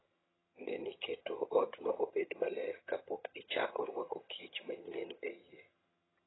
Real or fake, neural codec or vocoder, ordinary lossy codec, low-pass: fake; vocoder, 22.05 kHz, 80 mel bands, HiFi-GAN; AAC, 16 kbps; 7.2 kHz